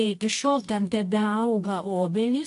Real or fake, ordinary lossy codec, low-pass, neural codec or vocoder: fake; AAC, 64 kbps; 10.8 kHz; codec, 24 kHz, 0.9 kbps, WavTokenizer, medium music audio release